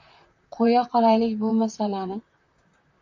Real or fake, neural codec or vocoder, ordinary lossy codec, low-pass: fake; vocoder, 44.1 kHz, 128 mel bands, Pupu-Vocoder; AAC, 48 kbps; 7.2 kHz